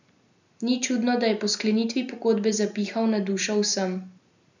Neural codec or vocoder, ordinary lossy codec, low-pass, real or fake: none; none; 7.2 kHz; real